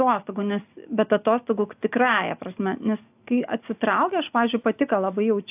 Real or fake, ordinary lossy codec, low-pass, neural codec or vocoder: real; AAC, 32 kbps; 3.6 kHz; none